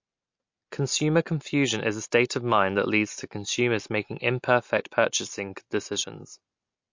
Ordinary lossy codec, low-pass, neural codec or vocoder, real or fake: MP3, 48 kbps; 7.2 kHz; none; real